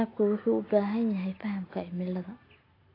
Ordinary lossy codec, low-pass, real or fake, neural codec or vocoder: AAC, 24 kbps; 5.4 kHz; real; none